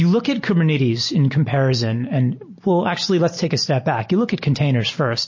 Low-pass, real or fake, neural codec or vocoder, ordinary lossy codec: 7.2 kHz; real; none; MP3, 32 kbps